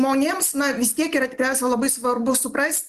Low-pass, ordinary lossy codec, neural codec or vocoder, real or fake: 14.4 kHz; Opus, 24 kbps; none; real